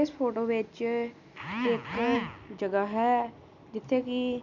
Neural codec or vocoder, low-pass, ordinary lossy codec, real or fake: none; 7.2 kHz; none; real